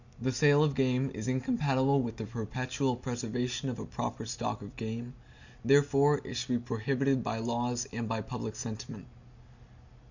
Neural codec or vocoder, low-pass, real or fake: none; 7.2 kHz; real